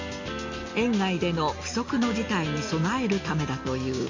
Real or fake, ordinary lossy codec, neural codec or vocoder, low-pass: real; MP3, 64 kbps; none; 7.2 kHz